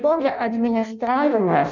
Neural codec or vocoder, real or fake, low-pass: codec, 16 kHz in and 24 kHz out, 0.6 kbps, FireRedTTS-2 codec; fake; 7.2 kHz